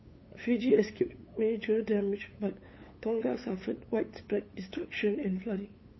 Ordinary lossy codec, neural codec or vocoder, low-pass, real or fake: MP3, 24 kbps; codec, 16 kHz, 8 kbps, FunCodec, trained on LibriTTS, 25 frames a second; 7.2 kHz; fake